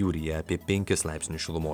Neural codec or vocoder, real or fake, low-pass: none; real; 19.8 kHz